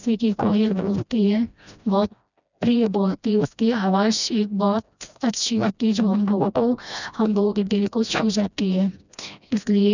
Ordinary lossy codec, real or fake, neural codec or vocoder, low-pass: none; fake; codec, 16 kHz, 1 kbps, FreqCodec, smaller model; 7.2 kHz